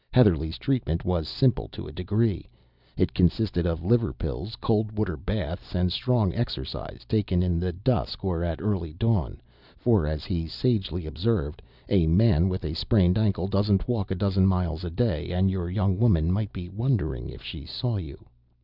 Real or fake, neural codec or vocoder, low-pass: real; none; 5.4 kHz